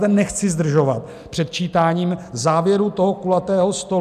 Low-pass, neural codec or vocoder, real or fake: 14.4 kHz; none; real